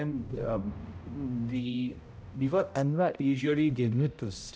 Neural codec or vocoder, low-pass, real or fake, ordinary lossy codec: codec, 16 kHz, 0.5 kbps, X-Codec, HuBERT features, trained on balanced general audio; none; fake; none